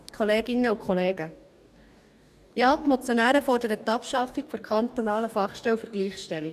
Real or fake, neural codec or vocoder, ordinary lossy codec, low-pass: fake; codec, 44.1 kHz, 2.6 kbps, DAC; none; 14.4 kHz